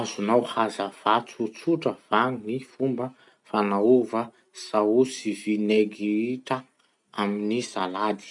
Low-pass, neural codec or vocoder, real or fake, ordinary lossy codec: 10.8 kHz; none; real; none